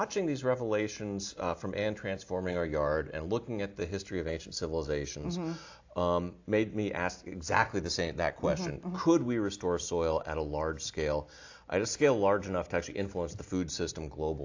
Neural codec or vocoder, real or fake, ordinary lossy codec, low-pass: none; real; AAC, 48 kbps; 7.2 kHz